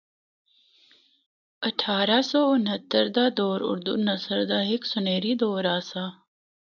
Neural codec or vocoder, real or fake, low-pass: none; real; 7.2 kHz